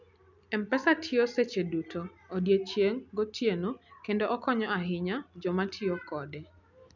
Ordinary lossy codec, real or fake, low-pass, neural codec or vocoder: none; real; 7.2 kHz; none